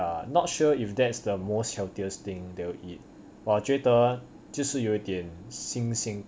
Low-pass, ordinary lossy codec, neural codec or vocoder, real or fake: none; none; none; real